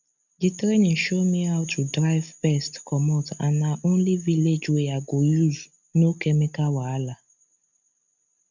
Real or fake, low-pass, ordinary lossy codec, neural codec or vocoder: real; 7.2 kHz; none; none